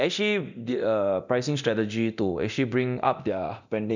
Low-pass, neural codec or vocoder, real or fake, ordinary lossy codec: 7.2 kHz; codec, 24 kHz, 0.9 kbps, DualCodec; fake; none